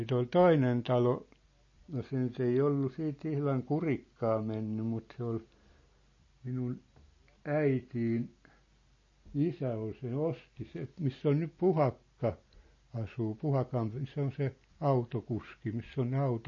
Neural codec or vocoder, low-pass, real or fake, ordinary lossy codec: none; 7.2 kHz; real; MP3, 32 kbps